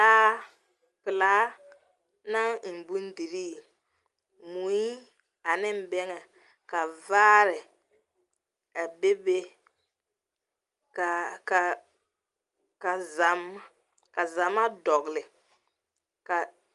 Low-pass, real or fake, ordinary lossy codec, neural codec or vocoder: 10.8 kHz; real; Opus, 32 kbps; none